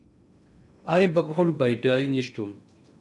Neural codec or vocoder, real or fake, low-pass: codec, 16 kHz in and 24 kHz out, 0.6 kbps, FocalCodec, streaming, 2048 codes; fake; 10.8 kHz